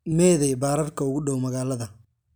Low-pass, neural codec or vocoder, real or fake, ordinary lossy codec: none; none; real; none